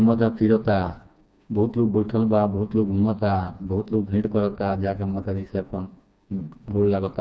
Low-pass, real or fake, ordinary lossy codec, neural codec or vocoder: none; fake; none; codec, 16 kHz, 2 kbps, FreqCodec, smaller model